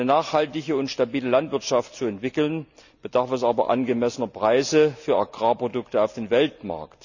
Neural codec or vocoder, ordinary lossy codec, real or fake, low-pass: none; none; real; 7.2 kHz